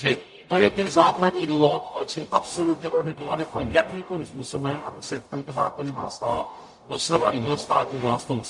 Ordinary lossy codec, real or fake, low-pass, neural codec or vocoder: MP3, 48 kbps; fake; 10.8 kHz; codec, 44.1 kHz, 0.9 kbps, DAC